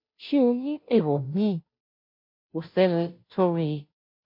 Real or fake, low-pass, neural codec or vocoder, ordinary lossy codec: fake; 5.4 kHz; codec, 16 kHz, 0.5 kbps, FunCodec, trained on Chinese and English, 25 frames a second; MP3, 32 kbps